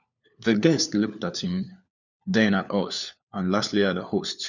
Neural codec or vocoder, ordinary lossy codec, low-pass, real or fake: codec, 16 kHz, 4 kbps, FunCodec, trained on LibriTTS, 50 frames a second; none; 7.2 kHz; fake